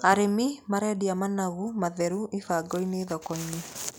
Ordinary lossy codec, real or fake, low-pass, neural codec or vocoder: none; real; none; none